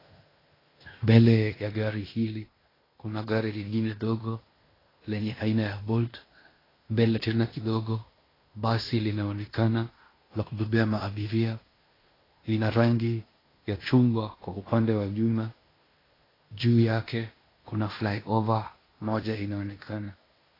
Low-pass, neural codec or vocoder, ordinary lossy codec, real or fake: 5.4 kHz; codec, 16 kHz in and 24 kHz out, 0.9 kbps, LongCat-Audio-Codec, fine tuned four codebook decoder; AAC, 24 kbps; fake